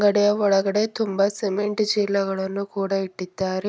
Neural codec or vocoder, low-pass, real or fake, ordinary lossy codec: none; none; real; none